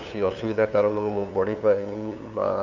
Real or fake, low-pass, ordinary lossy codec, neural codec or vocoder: fake; 7.2 kHz; none; codec, 16 kHz, 2 kbps, FunCodec, trained on LibriTTS, 25 frames a second